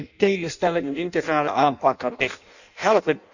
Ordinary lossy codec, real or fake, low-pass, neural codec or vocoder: none; fake; 7.2 kHz; codec, 16 kHz in and 24 kHz out, 0.6 kbps, FireRedTTS-2 codec